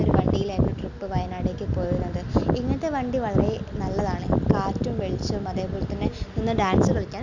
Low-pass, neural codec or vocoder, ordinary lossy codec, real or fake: 7.2 kHz; none; none; real